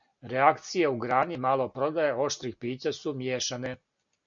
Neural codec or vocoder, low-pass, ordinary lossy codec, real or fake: none; 7.2 kHz; MP3, 48 kbps; real